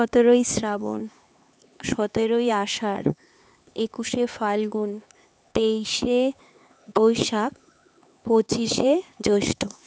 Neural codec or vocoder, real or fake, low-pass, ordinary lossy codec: codec, 16 kHz, 4 kbps, X-Codec, HuBERT features, trained on LibriSpeech; fake; none; none